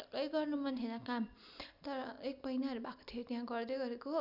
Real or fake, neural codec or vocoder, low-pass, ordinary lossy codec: real; none; 5.4 kHz; none